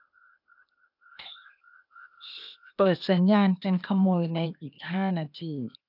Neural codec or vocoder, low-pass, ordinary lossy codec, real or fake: codec, 16 kHz, 0.8 kbps, ZipCodec; 5.4 kHz; none; fake